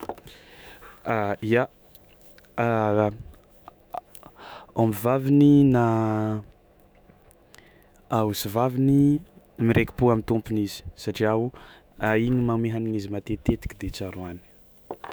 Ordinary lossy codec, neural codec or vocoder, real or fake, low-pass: none; autoencoder, 48 kHz, 128 numbers a frame, DAC-VAE, trained on Japanese speech; fake; none